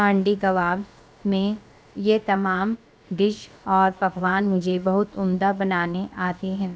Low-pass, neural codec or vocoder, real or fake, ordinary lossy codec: none; codec, 16 kHz, about 1 kbps, DyCAST, with the encoder's durations; fake; none